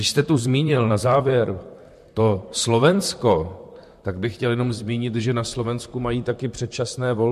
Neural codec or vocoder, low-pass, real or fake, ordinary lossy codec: vocoder, 44.1 kHz, 128 mel bands, Pupu-Vocoder; 14.4 kHz; fake; MP3, 64 kbps